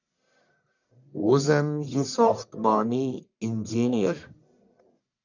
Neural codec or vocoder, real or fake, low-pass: codec, 44.1 kHz, 1.7 kbps, Pupu-Codec; fake; 7.2 kHz